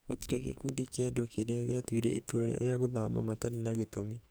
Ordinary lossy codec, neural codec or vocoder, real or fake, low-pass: none; codec, 44.1 kHz, 2.6 kbps, SNAC; fake; none